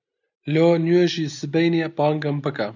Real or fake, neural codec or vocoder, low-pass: real; none; 7.2 kHz